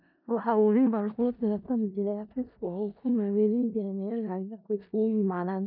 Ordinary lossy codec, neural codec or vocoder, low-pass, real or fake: none; codec, 16 kHz in and 24 kHz out, 0.4 kbps, LongCat-Audio-Codec, four codebook decoder; 5.4 kHz; fake